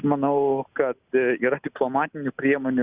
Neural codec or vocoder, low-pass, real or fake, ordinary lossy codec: none; 3.6 kHz; real; Opus, 64 kbps